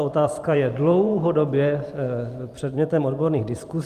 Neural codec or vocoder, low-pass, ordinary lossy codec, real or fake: vocoder, 44.1 kHz, 128 mel bands every 512 samples, BigVGAN v2; 14.4 kHz; Opus, 32 kbps; fake